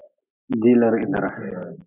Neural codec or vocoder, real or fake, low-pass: none; real; 3.6 kHz